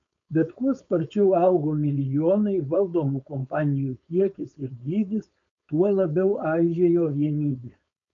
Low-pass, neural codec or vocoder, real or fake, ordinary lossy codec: 7.2 kHz; codec, 16 kHz, 4.8 kbps, FACodec; fake; MP3, 96 kbps